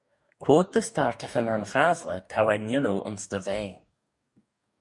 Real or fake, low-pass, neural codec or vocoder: fake; 10.8 kHz; codec, 44.1 kHz, 2.6 kbps, DAC